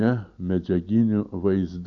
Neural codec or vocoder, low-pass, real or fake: none; 7.2 kHz; real